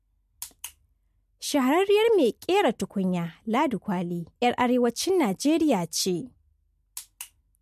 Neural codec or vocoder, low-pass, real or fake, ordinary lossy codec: none; 14.4 kHz; real; MP3, 64 kbps